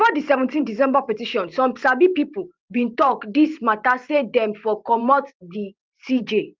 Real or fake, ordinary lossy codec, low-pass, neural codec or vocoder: fake; Opus, 32 kbps; 7.2 kHz; vocoder, 44.1 kHz, 128 mel bands every 512 samples, BigVGAN v2